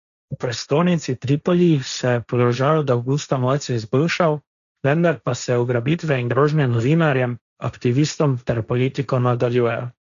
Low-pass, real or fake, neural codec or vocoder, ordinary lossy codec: 7.2 kHz; fake; codec, 16 kHz, 1.1 kbps, Voila-Tokenizer; none